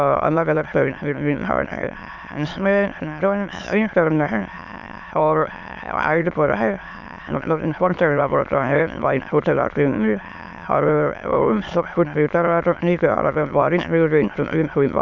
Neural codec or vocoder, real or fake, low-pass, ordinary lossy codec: autoencoder, 22.05 kHz, a latent of 192 numbers a frame, VITS, trained on many speakers; fake; 7.2 kHz; none